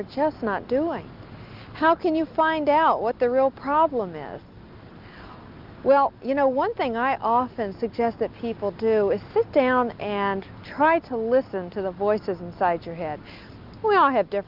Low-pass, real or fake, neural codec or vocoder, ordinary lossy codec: 5.4 kHz; real; none; Opus, 32 kbps